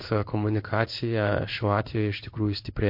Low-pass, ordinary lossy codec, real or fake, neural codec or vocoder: 5.4 kHz; MP3, 32 kbps; fake; codec, 16 kHz in and 24 kHz out, 1 kbps, XY-Tokenizer